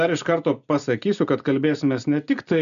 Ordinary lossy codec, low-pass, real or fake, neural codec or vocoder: MP3, 96 kbps; 7.2 kHz; real; none